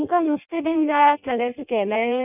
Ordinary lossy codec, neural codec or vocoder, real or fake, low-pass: none; codec, 16 kHz in and 24 kHz out, 0.6 kbps, FireRedTTS-2 codec; fake; 3.6 kHz